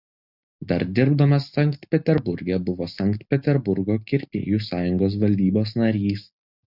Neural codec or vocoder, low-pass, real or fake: none; 5.4 kHz; real